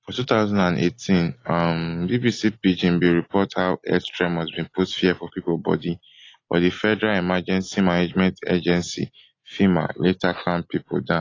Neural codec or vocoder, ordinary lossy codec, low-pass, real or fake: none; AAC, 32 kbps; 7.2 kHz; real